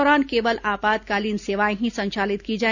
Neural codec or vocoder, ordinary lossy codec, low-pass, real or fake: none; none; 7.2 kHz; real